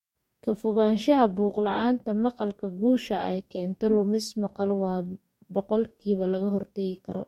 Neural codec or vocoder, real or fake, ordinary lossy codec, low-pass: codec, 44.1 kHz, 2.6 kbps, DAC; fake; MP3, 64 kbps; 19.8 kHz